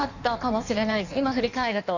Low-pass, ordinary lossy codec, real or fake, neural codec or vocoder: 7.2 kHz; none; fake; codec, 16 kHz in and 24 kHz out, 1.1 kbps, FireRedTTS-2 codec